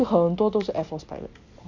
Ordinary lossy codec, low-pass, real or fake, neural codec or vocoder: none; 7.2 kHz; real; none